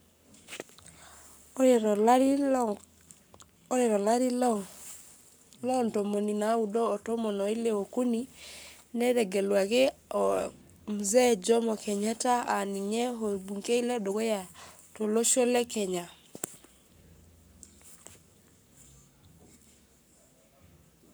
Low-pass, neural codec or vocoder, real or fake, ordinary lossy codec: none; codec, 44.1 kHz, 7.8 kbps, Pupu-Codec; fake; none